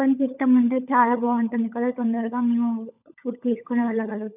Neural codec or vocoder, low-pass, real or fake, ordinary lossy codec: codec, 16 kHz, 16 kbps, FunCodec, trained on LibriTTS, 50 frames a second; 3.6 kHz; fake; none